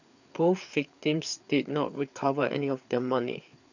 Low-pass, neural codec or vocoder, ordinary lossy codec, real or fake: 7.2 kHz; codec, 16 kHz, 4 kbps, FreqCodec, larger model; none; fake